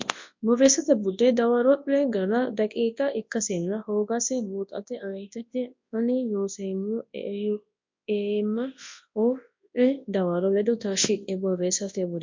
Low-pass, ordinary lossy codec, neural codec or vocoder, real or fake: 7.2 kHz; MP3, 48 kbps; codec, 24 kHz, 0.9 kbps, WavTokenizer, large speech release; fake